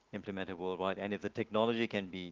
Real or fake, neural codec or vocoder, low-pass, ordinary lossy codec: real; none; 7.2 kHz; Opus, 16 kbps